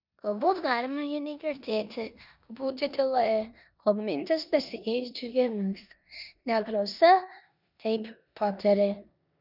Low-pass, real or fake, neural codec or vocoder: 5.4 kHz; fake; codec, 16 kHz in and 24 kHz out, 0.9 kbps, LongCat-Audio-Codec, four codebook decoder